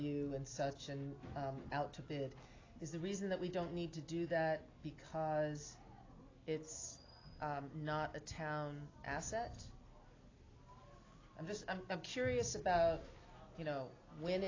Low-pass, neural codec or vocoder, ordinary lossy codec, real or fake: 7.2 kHz; none; AAC, 32 kbps; real